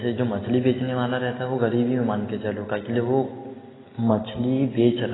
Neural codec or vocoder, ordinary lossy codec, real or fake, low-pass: none; AAC, 16 kbps; real; 7.2 kHz